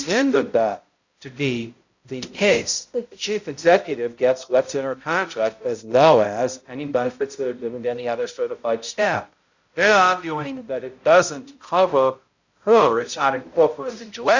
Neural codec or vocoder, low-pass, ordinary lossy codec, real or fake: codec, 16 kHz, 0.5 kbps, X-Codec, HuBERT features, trained on balanced general audio; 7.2 kHz; Opus, 64 kbps; fake